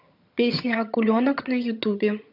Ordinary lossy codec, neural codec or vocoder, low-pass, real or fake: none; vocoder, 22.05 kHz, 80 mel bands, HiFi-GAN; 5.4 kHz; fake